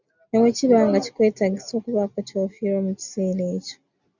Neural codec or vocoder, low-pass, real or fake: none; 7.2 kHz; real